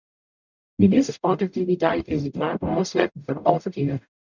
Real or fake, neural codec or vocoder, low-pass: fake; codec, 44.1 kHz, 0.9 kbps, DAC; 7.2 kHz